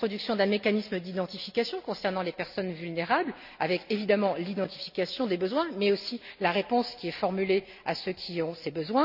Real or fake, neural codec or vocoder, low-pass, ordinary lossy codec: real; none; 5.4 kHz; none